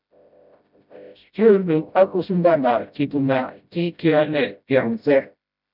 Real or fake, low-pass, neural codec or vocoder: fake; 5.4 kHz; codec, 16 kHz, 0.5 kbps, FreqCodec, smaller model